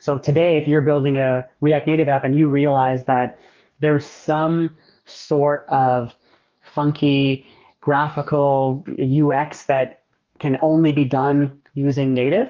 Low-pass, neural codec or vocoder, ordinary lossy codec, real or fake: 7.2 kHz; codec, 44.1 kHz, 2.6 kbps, DAC; Opus, 24 kbps; fake